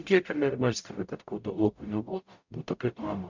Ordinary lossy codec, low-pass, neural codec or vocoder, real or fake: MP3, 64 kbps; 7.2 kHz; codec, 44.1 kHz, 0.9 kbps, DAC; fake